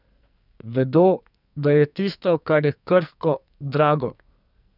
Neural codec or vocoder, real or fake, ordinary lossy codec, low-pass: codec, 44.1 kHz, 2.6 kbps, SNAC; fake; none; 5.4 kHz